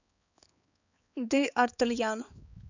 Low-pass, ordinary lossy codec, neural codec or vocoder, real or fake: 7.2 kHz; none; codec, 16 kHz, 4 kbps, X-Codec, HuBERT features, trained on balanced general audio; fake